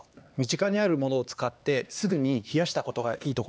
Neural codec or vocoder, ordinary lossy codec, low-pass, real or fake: codec, 16 kHz, 2 kbps, X-Codec, HuBERT features, trained on LibriSpeech; none; none; fake